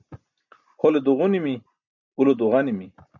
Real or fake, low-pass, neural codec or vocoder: real; 7.2 kHz; none